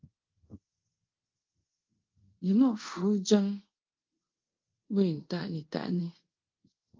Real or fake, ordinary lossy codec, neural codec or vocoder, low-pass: fake; Opus, 24 kbps; codec, 24 kHz, 0.5 kbps, DualCodec; 7.2 kHz